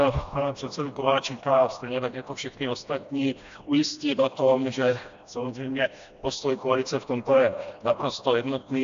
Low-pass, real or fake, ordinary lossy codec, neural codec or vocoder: 7.2 kHz; fake; AAC, 64 kbps; codec, 16 kHz, 1 kbps, FreqCodec, smaller model